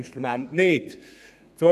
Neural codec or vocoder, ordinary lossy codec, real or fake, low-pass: codec, 32 kHz, 1.9 kbps, SNAC; none; fake; 14.4 kHz